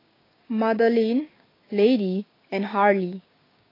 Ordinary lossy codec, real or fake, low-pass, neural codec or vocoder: AAC, 24 kbps; fake; 5.4 kHz; codec, 16 kHz, 6 kbps, DAC